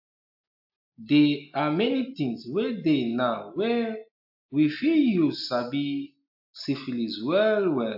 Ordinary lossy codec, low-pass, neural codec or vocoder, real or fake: AAC, 48 kbps; 5.4 kHz; none; real